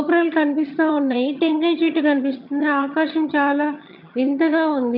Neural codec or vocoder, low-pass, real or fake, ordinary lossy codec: vocoder, 22.05 kHz, 80 mel bands, HiFi-GAN; 5.4 kHz; fake; none